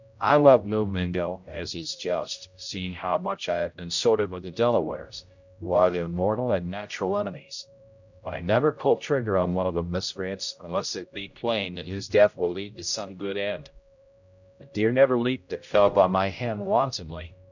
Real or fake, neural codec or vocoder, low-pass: fake; codec, 16 kHz, 0.5 kbps, X-Codec, HuBERT features, trained on general audio; 7.2 kHz